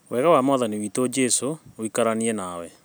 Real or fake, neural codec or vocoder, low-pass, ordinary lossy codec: real; none; none; none